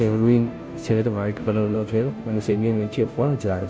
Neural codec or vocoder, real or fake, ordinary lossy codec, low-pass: codec, 16 kHz, 0.5 kbps, FunCodec, trained on Chinese and English, 25 frames a second; fake; none; none